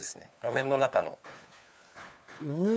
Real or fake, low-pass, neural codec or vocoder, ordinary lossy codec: fake; none; codec, 16 kHz, 4 kbps, FunCodec, trained on LibriTTS, 50 frames a second; none